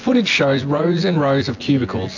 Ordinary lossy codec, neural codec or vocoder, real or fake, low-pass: AAC, 48 kbps; vocoder, 24 kHz, 100 mel bands, Vocos; fake; 7.2 kHz